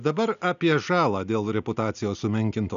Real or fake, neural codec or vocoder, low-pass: real; none; 7.2 kHz